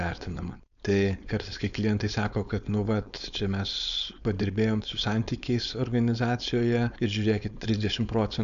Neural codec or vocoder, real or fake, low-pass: codec, 16 kHz, 4.8 kbps, FACodec; fake; 7.2 kHz